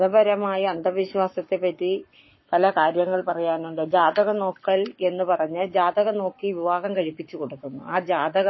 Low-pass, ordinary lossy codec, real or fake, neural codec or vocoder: 7.2 kHz; MP3, 24 kbps; fake; codec, 44.1 kHz, 7.8 kbps, Pupu-Codec